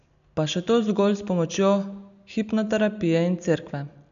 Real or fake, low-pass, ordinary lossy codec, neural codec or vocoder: real; 7.2 kHz; none; none